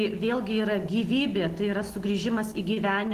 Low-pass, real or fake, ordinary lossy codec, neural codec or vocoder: 14.4 kHz; real; Opus, 16 kbps; none